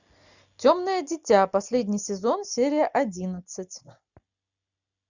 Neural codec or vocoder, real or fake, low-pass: none; real; 7.2 kHz